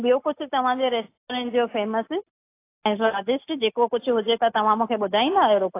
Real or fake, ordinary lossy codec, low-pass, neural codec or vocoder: real; AAC, 24 kbps; 3.6 kHz; none